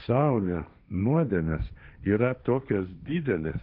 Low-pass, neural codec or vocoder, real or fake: 5.4 kHz; codec, 16 kHz, 1.1 kbps, Voila-Tokenizer; fake